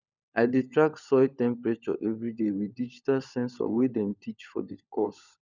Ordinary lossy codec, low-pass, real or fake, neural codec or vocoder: none; 7.2 kHz; fake; codec, 16 kHz, 16 kbps, FunCodec, trained on LibriTTS, 50 frames a second